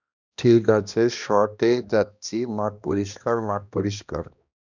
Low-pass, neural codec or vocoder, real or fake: 7.2 kHz; codec, 16 kHz, 1 kbps, X-Codec, HuBERT features, trained on balanced general audio; fake